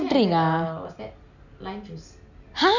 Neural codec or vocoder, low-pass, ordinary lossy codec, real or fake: none; 7.2 kHz; none; real